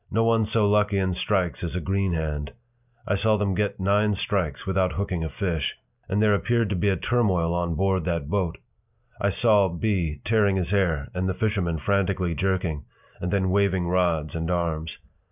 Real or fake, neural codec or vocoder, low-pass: real; none; 3.6 kHz